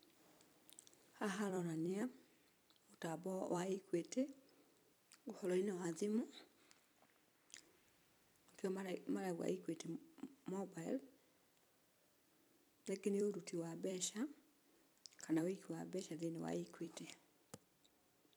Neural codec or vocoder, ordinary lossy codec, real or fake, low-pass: vocoder, 44.1 kHz, 128 mel bands every 256 samples, BigVGAN v2; none; fake; none